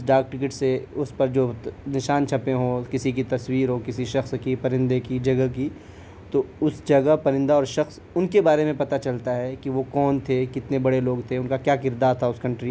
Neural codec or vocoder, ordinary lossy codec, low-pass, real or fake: none; none; none; real